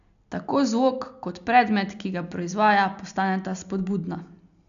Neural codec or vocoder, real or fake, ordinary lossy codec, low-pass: none; real; none; 7.2 kHz